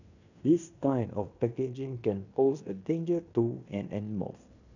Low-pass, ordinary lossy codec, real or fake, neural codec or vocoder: 7.2 kHz; none; fake; codec, 16 kHz in and 24 kHz out, 0.9 kbps, LongCat-Audio-Codec, fine tuned four codebook decoder